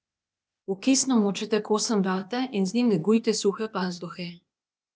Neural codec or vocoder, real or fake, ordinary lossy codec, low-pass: codec, 16 kHz, 0.8 kbps, ZipCodec; fake; none; none